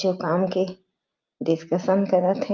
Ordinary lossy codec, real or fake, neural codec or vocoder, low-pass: Opus, 32 kbps; real; none; 7.2 kHz